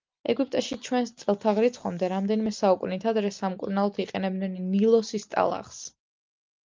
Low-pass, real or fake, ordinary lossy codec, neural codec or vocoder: 7.2 kHz; real; Opus, 24 kbps; none